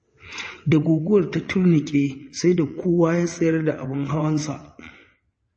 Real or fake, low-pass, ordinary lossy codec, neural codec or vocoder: fake; 9.9 kHz; MP3, 32 kbps; vocoder, 22.05 kHz, 80 mel bands, WaveNeXt